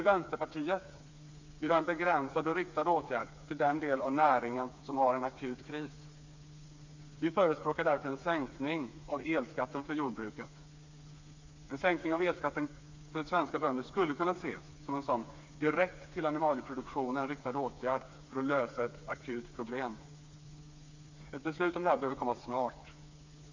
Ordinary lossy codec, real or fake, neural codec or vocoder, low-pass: MP3, 64 kbps; fake; codec, 16 kHz, 4 kbps, FreqCodec, smaller model; 7.2 kHz